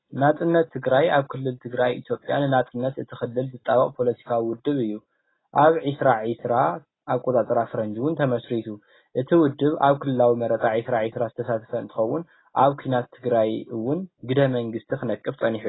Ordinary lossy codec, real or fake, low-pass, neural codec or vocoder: AAC, 16 kbps; real; 7.2 kHz; none